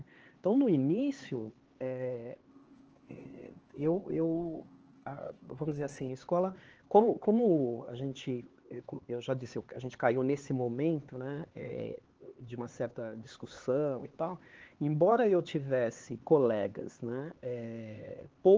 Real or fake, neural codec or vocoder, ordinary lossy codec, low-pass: fake; codec, 16 kHz, 4 kbps, X-Codec, HuBERT features, trained on LibriSpeech; Opus, 16 kbps; 7.2 kHz